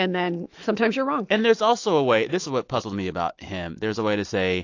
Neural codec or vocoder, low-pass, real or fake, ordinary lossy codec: autoencoder, 48 kHz, 128 numbers a frame, DAC-VAE, trained on Japanese speech; 7.2 kHz; fake; AAC, 48 kbps